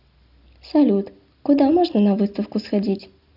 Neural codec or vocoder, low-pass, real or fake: none; 5.4 kHz; real